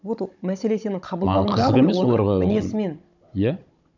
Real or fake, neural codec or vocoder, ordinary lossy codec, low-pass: fake; codec, 16 kHz, 16 kbps, FunCodec, trained on Chinese and English, 50 frames a second; none; 7.2 kHz